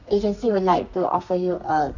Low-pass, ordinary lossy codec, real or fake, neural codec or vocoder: 7.2 kHz; none; fake; codec, 32 kHz, 1.9 kbps, SNAC